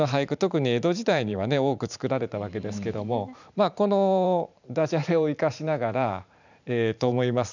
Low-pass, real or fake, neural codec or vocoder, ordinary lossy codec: 7.2 kHz; fake; vocoder, 44.1 kHz, 128 mel bands every 512 samples, BigVGAN v2; none